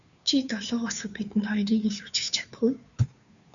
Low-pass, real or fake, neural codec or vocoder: 7.2 kHz; fake; codec, 16 kHz, 2 kbps, FunCodec, trained on Chinese and English, 25 frames a second